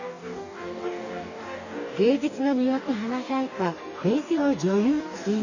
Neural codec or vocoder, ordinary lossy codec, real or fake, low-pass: codec, 44.1 kHz, 2.6 kbps, DAC; none; fake; 7.2 kHz